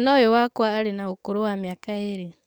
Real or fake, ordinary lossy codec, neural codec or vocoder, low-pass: fake; none; codec, 44.1 kHz, 7.8 kbps, DAC; none